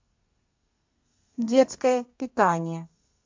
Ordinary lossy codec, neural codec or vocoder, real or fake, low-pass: MP3, 48 kbps; codec, 32 kHz, 1.9 kbps, SNAC; fake; 7.2 kHz